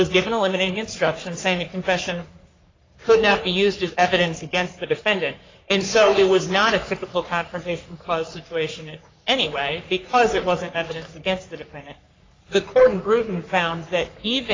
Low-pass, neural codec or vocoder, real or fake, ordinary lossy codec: 7.2 kHz; codec, 44.1 kHz, 3.4 kbps, Pupu-Codec; fake; AAC, 32 kbps